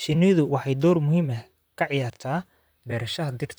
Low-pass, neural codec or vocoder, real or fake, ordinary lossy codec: none; vocoder, 44.1 kHz, 128 mel bands, Pupu-Vocoder; fake; none